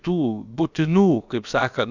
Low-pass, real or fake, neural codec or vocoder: 7.2 kHz; fake; codec, 16 kHz, about 1 kbps, DyCAST, with the encoder's durations